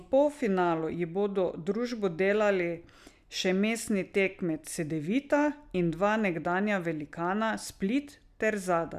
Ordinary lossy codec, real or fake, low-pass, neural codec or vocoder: none; real; 14.4 kHz; none